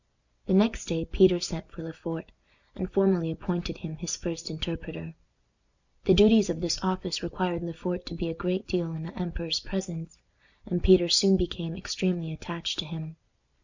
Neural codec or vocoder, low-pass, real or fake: none; 7.2 kHz; real